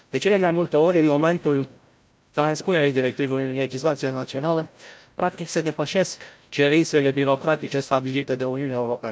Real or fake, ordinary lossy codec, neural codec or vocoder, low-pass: fake; none; codec, 16 kHz, 0.5 kbps, FreqCodec, larger model; none